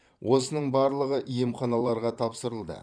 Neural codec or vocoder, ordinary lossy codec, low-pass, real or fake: vocoder, 22.05 kHz, 80 mel bands, Vocos; none; 9.9 kHz; fake